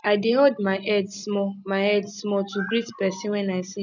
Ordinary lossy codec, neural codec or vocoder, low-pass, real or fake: none; none; 7.2 kHz; real